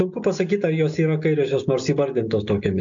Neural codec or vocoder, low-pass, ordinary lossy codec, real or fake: none; 7.2 kHz; AAC, 64 kbps; real